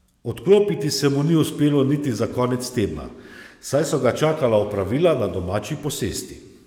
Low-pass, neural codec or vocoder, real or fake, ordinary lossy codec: 19.8 kHz; codec, 44.1 kHz, 7.8 kbps, DAC; fake; none